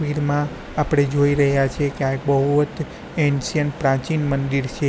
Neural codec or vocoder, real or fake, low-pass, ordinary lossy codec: none; real; none; none